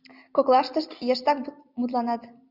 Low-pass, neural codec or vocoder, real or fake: 5.4 kHz; none; real